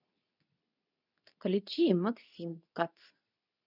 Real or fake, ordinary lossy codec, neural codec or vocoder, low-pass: fake; none; codec, 24 kHz, 0.9 kbps, WavTokenizer, medium speech release version 2; 5.4 kHz